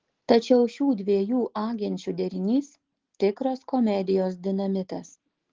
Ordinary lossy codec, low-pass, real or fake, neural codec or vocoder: Opus, 16 kbps; 7.2 kHz; real; none